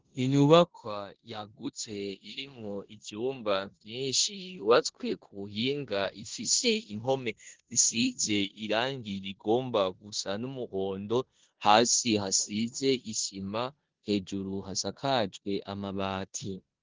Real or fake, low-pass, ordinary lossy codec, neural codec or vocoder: fake; 7.2 kHz; Opus, 16 kbps; codec, 16 kHz in and 24 kHz out, 0.9 kbps, LongCat-Audio-Codec, four codebook decoder